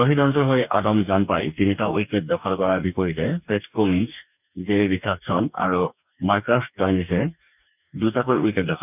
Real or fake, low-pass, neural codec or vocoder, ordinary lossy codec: fake; 3.6 kHz; codec, 44.1 kHz, 2.6 kbps, DAC; none